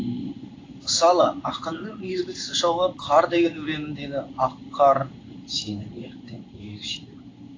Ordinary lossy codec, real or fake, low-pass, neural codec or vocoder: AAC, 32 kbps; fake; 7.2 kHz; codec, 16 kHz in and 24 kHz out, 1 kbps, XY-Tokenizer